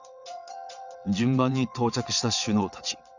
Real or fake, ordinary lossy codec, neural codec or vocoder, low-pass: fake; none; vocoder, 22.05 kHz, 80 mel bands, WaveNeXt; 7.2 kHz